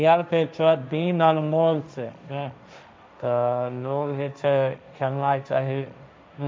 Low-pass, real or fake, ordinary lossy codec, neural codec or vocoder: none; fake; none; codec, 16 kHz, 1.1 kbps, Voila-Tokenizer